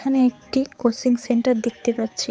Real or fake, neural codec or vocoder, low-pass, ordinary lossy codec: fake; codec, 16 kHz, 4 kbps, X-Codec, HuBERT features, trained on balanced general audio; none; none